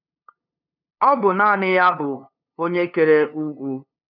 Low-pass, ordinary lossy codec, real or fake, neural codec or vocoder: 5.4 kHz; none; fake; codec, 16 kHz, 2 kbps, FunCodec, trained on LibriTTS, 25 frames a second